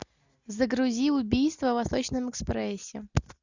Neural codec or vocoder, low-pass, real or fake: none; 7.2 kHz; real